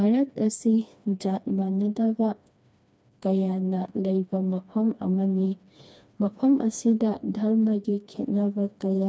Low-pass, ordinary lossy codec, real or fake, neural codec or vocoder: none; none; fake; codec, 16 kHz, 2 kbps, FreqCodec, smaller model